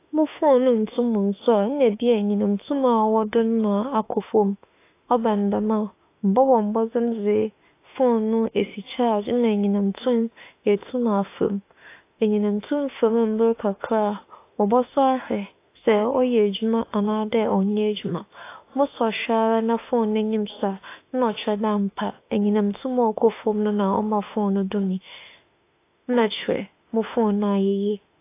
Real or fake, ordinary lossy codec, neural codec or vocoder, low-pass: fake; AAC, 24 kbps; autoencoder, 48 kHz, 32 numbers a frame, DAC-VAE, trained on Japanese speech; 3.6 kHz